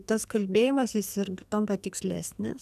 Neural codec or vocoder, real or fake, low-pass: codec, 44.1 kHz, 2.6 kbps, SNAC; fake; 14.4 kHz